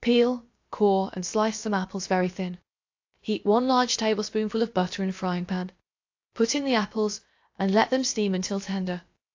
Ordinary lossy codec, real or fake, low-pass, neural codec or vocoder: AAC, 48 kbps; fake; 7.2 kHz; codec, 16 kHz, about 1 kbps, DyCAST, with the encoder's durations